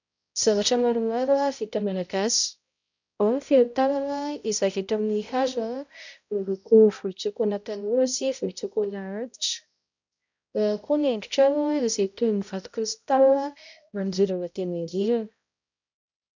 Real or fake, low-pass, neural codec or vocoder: fake; 7.2 kHz; codec, 16 kHz, 0.5 kbps, X-Codec, HuBERT features, trained on balanced general audio